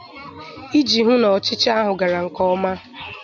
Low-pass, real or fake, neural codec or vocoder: 7.2 kHz; real; none